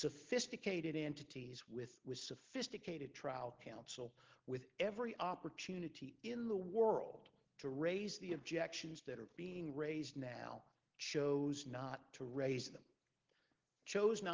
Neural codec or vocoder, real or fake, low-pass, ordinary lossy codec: none; real; 7.2 kHz; Opus, 16 kbps